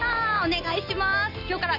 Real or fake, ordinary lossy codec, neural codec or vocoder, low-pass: real; Opus, 64 kbps; none; 5.4 kHz